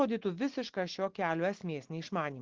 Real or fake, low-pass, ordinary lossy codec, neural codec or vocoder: real; 7.2 kHz; Opus, 16 kbps; none